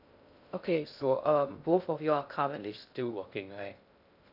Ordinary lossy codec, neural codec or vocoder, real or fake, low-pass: none; codec, 16 kHz in and 24 kHz out, 0.6 kbps, FocalCodec, streaming, 2048 codes; fake; 5.4 kHz